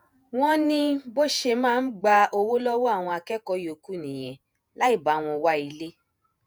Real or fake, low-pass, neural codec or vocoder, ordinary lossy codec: fake; none; vocoder, 48 kHz, 128 mel bands, Vocos; none